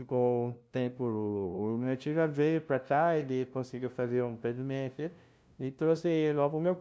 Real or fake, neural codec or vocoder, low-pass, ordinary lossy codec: fake; codec, 16 kHz, 0.5 kbps, FunCodec, trained on LibriTTS, 25 frames a second; none; none